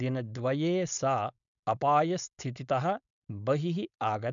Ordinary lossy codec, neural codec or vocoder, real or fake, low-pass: none; codec, 16 kHz, 4.8 kbps, FACodec; fake; 7.2 kHz